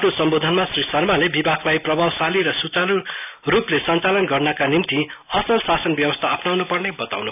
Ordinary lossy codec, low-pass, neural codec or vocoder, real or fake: none; 3.6 kHz; none; real